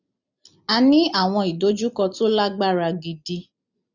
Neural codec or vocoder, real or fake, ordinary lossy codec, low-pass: none; real; none; 7.2 kHz